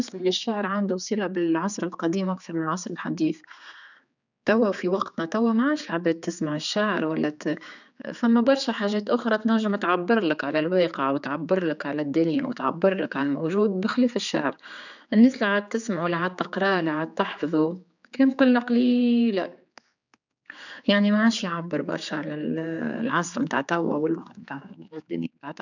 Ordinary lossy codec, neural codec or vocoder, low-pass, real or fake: none; codec, 16 kHz, 4 kbps, X-Codec, HuBERT features, trained on general audio; 7.2 kHz; fake